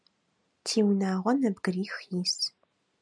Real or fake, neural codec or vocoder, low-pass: real; none; 9.9 kHz